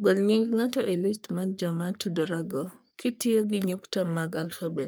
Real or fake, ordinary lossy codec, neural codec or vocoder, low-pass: fake; none; codec, 44.1 kHz, 3.4 kbps, Pupu-Codec; none